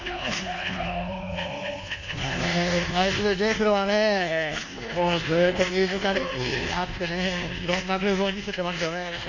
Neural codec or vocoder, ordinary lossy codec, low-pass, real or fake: codec, 24 kHz, 1.2 kbps, DualCodec; none; 7.2 kHz; fake